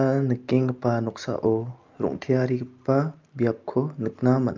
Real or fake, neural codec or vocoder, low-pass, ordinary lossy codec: real; none; 7.2 kHz; Opus, 24 kbps